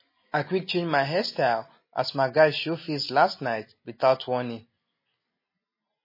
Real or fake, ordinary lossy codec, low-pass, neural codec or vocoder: real; MP3, 24 kbps; 5.4 kHz; none